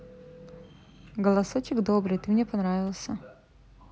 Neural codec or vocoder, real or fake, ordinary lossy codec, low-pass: none; real; none; none